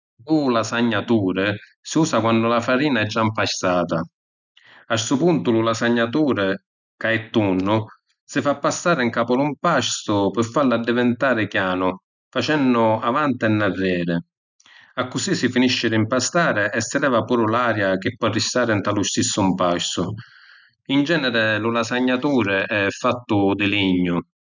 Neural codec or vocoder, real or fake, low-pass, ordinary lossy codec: none; real; 7.2 kHz; none